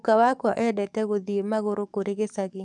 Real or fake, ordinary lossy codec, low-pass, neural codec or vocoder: fake; none; 10.8 kHz; codec, 44.1 kHz, 7.8 kbps, DAC